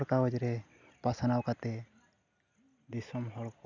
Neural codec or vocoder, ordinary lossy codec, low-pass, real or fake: none; none; 7.2 kHz; real